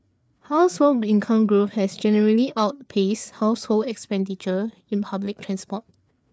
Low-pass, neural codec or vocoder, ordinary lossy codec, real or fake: none; codec, 16 kHz, 4 kbps, FreqCodec, larger model; none; fake